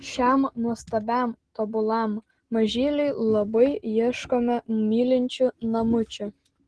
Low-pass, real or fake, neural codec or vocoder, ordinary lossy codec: 10.8 kHz; real; none; Opus, 16 kbps